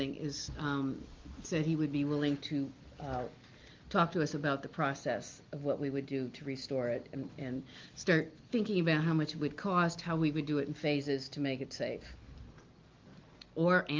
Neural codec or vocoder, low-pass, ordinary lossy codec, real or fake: none; 7.2 kHz; Opus, 32 kbps; real